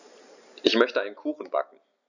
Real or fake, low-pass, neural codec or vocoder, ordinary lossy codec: real; 7.2 kHz; none; none